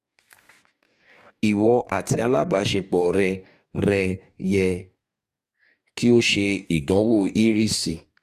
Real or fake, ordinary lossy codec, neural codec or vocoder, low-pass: fake; Opus, 64 kbps; codec, 44.1 kHz, 2.6 kbps, DAC; 14.4 kHz